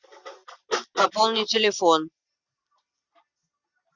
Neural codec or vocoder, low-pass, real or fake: none; 7.2 kHz; real